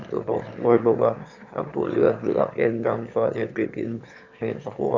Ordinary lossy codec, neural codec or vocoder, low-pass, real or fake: Opus, 64 kbps; autoencoder, 22.05 kHz, a latent of 192 numbers a frame, VITS, trained on one speaker; 7.2 kHz; fake